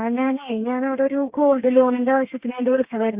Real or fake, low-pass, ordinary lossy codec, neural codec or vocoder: fake; 3.6 kHz; Opus, 64 kbps; codec, 32 kHz, 1.9 kbps, SNAC